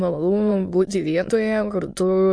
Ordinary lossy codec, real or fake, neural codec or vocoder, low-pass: MP3, 48 kbps; fake; autoencoder, 22.05 kHz, a latent of 192 numbers a frame, VITS, trained on many speakers; 9.9 kHz